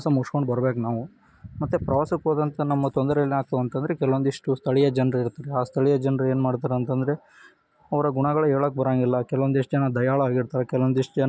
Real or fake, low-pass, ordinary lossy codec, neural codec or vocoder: real; none; none; none